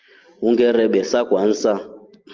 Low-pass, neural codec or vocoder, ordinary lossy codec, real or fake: 7.2 kHz; none; Opus, 32 kbps; real